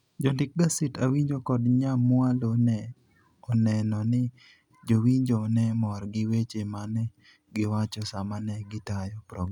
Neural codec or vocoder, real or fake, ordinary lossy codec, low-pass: none; real; none; 19.8 kHz